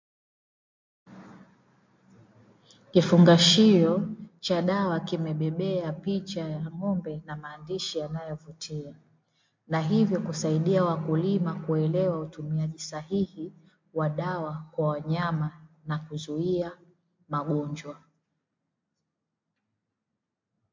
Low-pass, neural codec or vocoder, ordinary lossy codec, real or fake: 7.2 kHz; none; MP3, 48 kbps; real